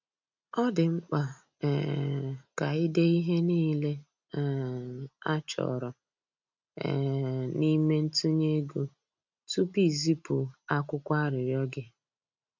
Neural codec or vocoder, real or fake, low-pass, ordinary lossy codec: none; real; 7.2 kHz; MP3, 64 kbps